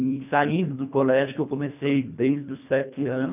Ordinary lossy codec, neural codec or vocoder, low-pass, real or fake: none; codec, 24 kHz, 1.5 kbps, HILCodec; 3.6 kHz; fake